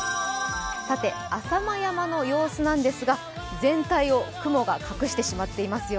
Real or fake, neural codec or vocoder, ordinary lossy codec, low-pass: real; none; none; none